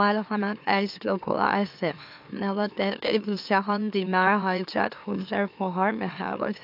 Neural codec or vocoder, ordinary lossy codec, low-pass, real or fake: autoencoder, 44.1 kHz, a latent of 192 numbers a frame, MeloTTS; AAC, 48 kbps; 5.4 kHz; fake